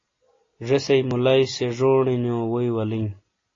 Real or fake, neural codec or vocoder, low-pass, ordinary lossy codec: real; none; 7.2 kHz; AAC, 32 kbps